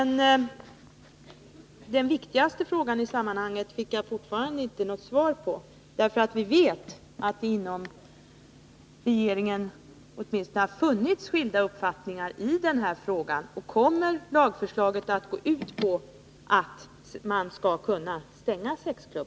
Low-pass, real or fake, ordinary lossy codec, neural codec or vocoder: none; real; none; none